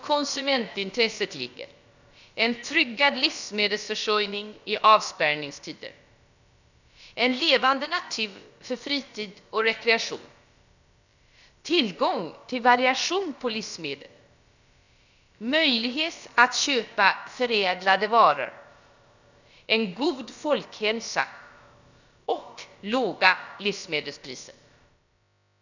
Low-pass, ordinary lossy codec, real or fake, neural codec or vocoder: 7.2 kHz; none; fake; codec, 16 kHz, about 1 kbps, DyCAST, with the encoder's durations